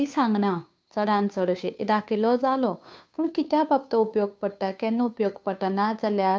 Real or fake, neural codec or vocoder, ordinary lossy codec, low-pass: fake; codec, 16 kHz, 2 kbps, FunCodec, trained on Chinese and English, 25 frames a second; none; none